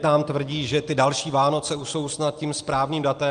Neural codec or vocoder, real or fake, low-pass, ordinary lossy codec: none; real; 9.9 kHz; Opus, 32 kbps